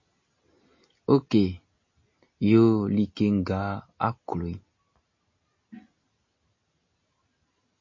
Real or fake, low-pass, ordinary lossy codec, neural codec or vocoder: real; 7.2 kHz; MP3, 32 kbps; none